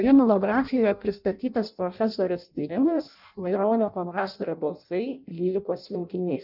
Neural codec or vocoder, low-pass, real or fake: codec, 16 kHz in and 24 kHz out, 0.6 kbps, FireRedTTS-2 codec; 5.4 kHz; fake